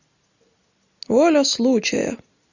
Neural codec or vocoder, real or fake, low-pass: none; real; 7.2 kHz